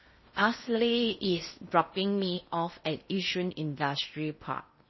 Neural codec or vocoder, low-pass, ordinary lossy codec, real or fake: codec, 16 kHz in and 24 kHz out, 0.6 kbps, FocalCodec, streaming, 4096 codes; 7.2 kHz; MP3, 24 kbps; fake